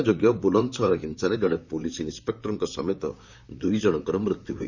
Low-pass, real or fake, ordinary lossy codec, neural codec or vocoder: 7.2 kHz; fake; none; vocoder, 44.1 kHz, 128 mel bands, Pupu-Vocoder